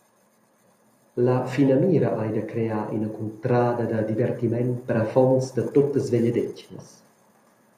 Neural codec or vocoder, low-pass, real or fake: none; 14.4 kHz; real